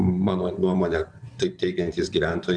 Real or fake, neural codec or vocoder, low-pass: fake; vocoder, 24 kHz, 100 mel bands, Vocos; 9.9 kHz